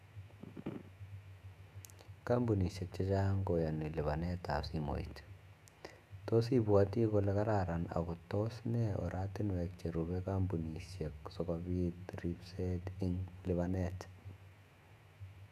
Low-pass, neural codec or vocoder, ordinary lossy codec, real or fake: 14.4 kHz; autoencoder, 48 kHz, 128 numbers a frame, DAC-VAE, trained on Japanese speech; none; fake